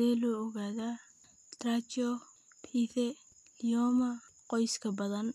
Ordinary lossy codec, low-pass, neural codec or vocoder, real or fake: none; 14.4 kHz; none; real